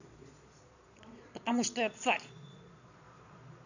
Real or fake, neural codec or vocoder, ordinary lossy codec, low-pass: fake; vocoder, 22.05 kHz, 80 mel bands, Vocos; none; 7.2 kHz